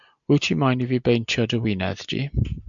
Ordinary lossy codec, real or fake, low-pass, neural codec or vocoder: MP3, 64 kbps; real; 7.2 kHz; none